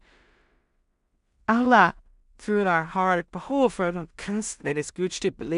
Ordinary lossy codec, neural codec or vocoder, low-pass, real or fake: none; codec, 16 kHz in and 24 kHz out, 0.4 kbps, LongCat-Audio-Codec, two codebook decoder; 10.8 kHz; fake